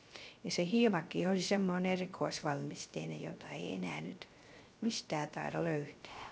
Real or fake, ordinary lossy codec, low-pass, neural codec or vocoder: fake; none; none; codec, 16 kHz, 0.3 kbps, FocalCodec